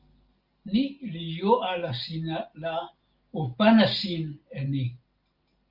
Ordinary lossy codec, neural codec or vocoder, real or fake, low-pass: Opus, 24 kbps; none; real; 5.4 kHz